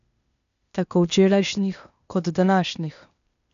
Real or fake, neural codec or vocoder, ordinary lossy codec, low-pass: fake; codec, 16 kHz, 0.8 kbps, ZipCodec; none; 7.2 kHz